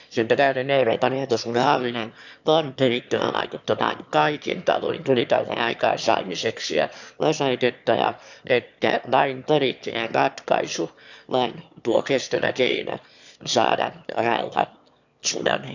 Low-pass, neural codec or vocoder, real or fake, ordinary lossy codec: 7.2 kHz; autoencoder, 22.05 kHz, a latent of 192 numbers a frame, VITS, trained on one speaker; fake; none